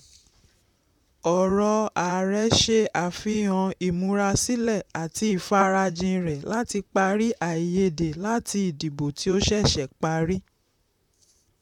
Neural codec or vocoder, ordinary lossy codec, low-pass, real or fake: vocoder, 44.1 kHz, 128 mel bands, Pupu-Vocoder; none; 19.8 kHz; fake